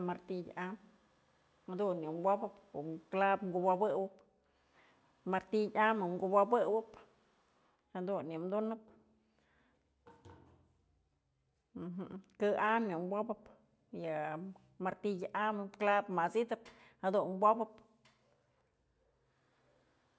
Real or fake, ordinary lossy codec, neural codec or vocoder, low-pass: real; none; none; none